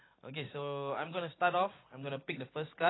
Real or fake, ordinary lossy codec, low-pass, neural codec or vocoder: real; AAC, 16 kbps; 7.2 kHz; none